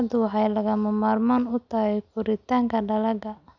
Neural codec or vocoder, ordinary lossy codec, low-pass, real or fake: none; none; 7.2 kHz; real